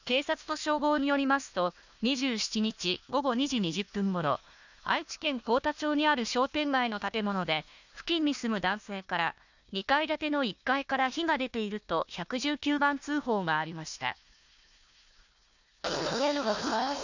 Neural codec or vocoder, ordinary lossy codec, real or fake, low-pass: codec, 16 kHz, 1 kbps, FunCodec, trained on Chinese and English, 50 frames a second; none; fake; 7.2 kHz